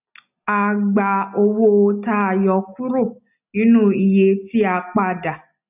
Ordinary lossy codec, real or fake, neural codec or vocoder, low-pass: none; real; none; 3.6 kHz